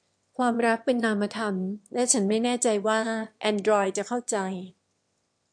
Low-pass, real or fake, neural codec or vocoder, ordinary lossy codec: 9.9 kHz; fake; autoencoder, 22.05 kHz, a latent of 192 numbers a frame, VITS, trained on one speaker; MP3, 64 kbps